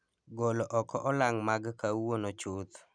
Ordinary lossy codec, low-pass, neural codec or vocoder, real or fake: none; 9.9 kHz; none; real